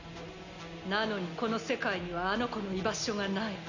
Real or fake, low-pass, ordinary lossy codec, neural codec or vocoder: real; 7.2 kHz; none; none